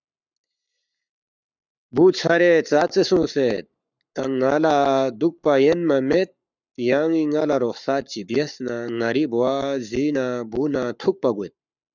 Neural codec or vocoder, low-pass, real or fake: codec, 44.1 kHz, 7.8 kbps, Pupu-Codec; 7.2 kHz; fake